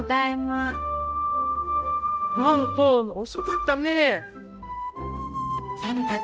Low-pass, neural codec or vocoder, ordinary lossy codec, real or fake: none; codec, 16 kHz, 0.5 kbps, X-Codec, HuBERT features, trained on balanced general audio; none; fake